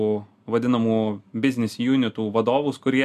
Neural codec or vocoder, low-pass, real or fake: none; 14.4 kHz; real